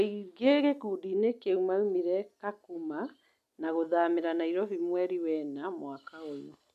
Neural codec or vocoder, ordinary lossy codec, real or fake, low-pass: none; none; real; 14.4 kHz